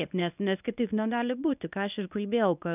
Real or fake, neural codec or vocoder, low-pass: fake; codec, 24 kHz, 0.9 kbps, WavTokenizer, medium speech release version 1; 3.6 kHz